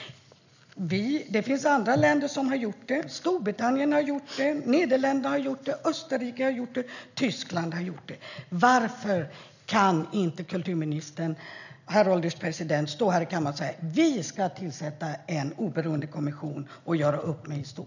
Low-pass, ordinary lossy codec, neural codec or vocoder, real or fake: 7.2 kHz; none; none; real